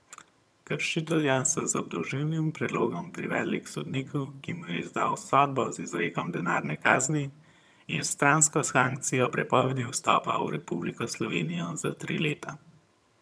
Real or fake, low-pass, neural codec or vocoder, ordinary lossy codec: fake; none; vocoder, 22.05 kHz, 80 mel bands, HiFi-GAN; none